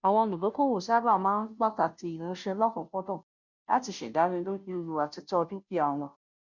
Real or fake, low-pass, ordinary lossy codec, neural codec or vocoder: fake; 7.2 kHz; none; codec, 16 kHz, 0.5 kbps, FunCodec, trained on Chinese and English, 25 frames a second